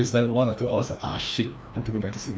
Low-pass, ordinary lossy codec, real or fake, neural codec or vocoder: none; none; fake; codec, 16 kHz, 1 kbps, FreqCodec, larger model